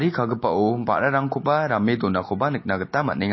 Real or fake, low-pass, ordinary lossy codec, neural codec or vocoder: real; 7.2 kHz; MP3, 24 kbps; none